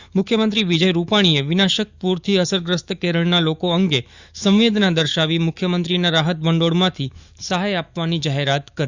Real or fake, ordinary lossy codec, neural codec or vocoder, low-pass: fake; Opus, 64 kbps; autoencoder, 48 kHz, 128 numbers a frame, DAC-VAE, trained on Japanese speech; 7.2 kHz